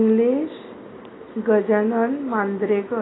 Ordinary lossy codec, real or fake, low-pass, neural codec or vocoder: AAC, 16 kbps; real; 7.2 kHz; none